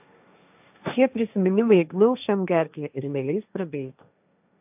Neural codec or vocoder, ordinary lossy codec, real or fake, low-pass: codec, 16 kHz, 1.1 kbps, Voila-Tokenizer; AAC, 32 kbps; fake; 3.6 kHz